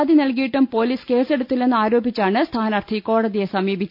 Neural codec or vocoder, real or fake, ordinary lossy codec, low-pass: none; real; none; 5.4 kHz